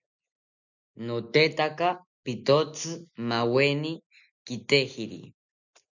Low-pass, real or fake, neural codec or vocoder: 7.2 kHz; real; none